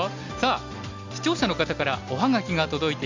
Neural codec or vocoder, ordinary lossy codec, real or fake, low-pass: none; MP3, 64 kbps; real; 7.2 kHz